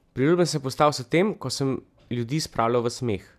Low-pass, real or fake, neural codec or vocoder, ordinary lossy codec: 14.4 kHz; real; none; none